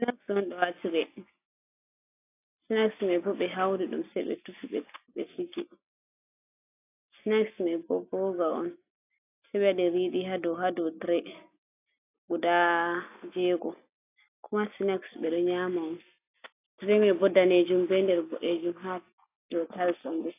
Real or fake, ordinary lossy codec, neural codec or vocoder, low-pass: real; AAC, 24 kbps; none; 3.6 kHz